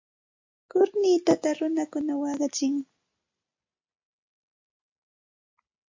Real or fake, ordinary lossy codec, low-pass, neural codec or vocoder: real; MP3, 48 kbps; 7.2 kHz; none